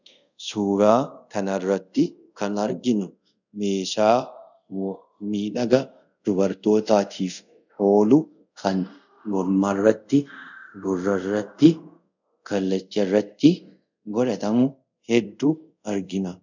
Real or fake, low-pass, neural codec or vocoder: fake; 7.2 kHz; codec, 24 kHz, 0.5 kbps, DualCodec